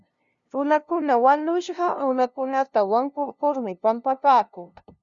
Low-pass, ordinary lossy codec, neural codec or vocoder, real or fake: 7.2 kHz; Opus, 64 kbps; codec, 16 kHz, 0.5 kbps, FunCodec, trained on LibriTTS, 25 frames a second; fake